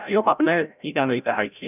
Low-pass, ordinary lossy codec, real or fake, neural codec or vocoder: 3.6 kHz; none; fake; codec, 16 kHz, 0.5 kbps, FreqCodec, larger model